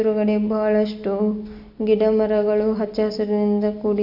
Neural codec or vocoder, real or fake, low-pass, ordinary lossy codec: none; real; 5.4 kHz; none